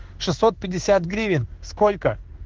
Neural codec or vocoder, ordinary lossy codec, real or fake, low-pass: codec, 16 kHz in and 24 kHz out, 1 kbps, XY-Tokenizer; Opus, 16 kbps; fake; 7.2 kHz